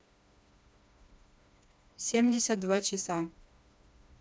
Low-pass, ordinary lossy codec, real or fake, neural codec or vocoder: none; none; fake; codec, 16 kHz, 2 kbps, FreqCodec, smaller model